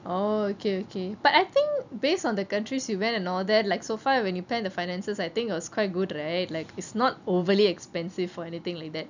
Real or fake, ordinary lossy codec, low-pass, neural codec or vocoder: real; none; 7.2 kHz; none